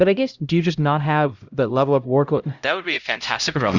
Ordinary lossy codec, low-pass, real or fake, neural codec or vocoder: Opus, 64 kbps; 7.2 kHz; fake; codec, 16 kHz, 0.5 kbps, X-Codec, HuBERT features, trained on LibriSpeech